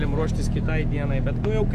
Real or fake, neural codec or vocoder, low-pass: real; none; 14.4 kHz